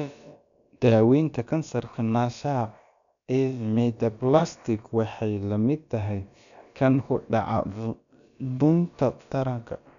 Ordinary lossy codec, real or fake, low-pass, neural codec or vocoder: none; fake; 7.2 kHz; codec, 16 kHz, about 1 kbps, DyCAST, with the encoder's durations